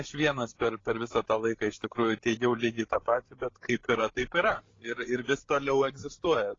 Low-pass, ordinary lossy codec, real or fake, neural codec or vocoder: 7.2 kHz; AAC, 32 kbps; fake; codec, 16 kHz, 16 kbps, FreqCodec, larger model